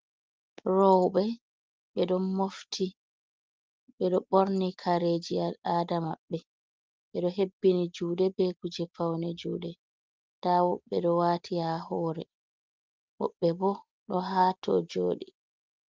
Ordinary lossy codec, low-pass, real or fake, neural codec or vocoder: Opus, 32 kbps; 7.2 kHz; real; none